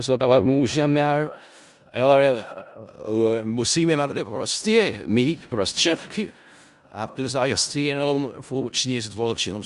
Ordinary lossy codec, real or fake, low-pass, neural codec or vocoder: Opus, 64 kbps; fake; 10.8 kHz; codec, 16 kHz in and 24 kHz out, 0.4 kbps, LongCat-Audio-Codec, four codebook decoder